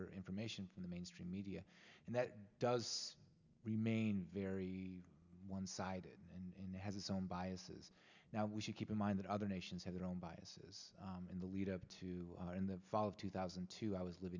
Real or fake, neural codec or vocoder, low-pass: real; none; 7.2 kHz